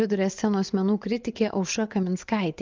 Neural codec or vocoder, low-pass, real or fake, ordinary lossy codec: vocoder, 24 kHz, 100 mel bands, Vocos; 7.2 kHz; fake; Opus, 24 kbps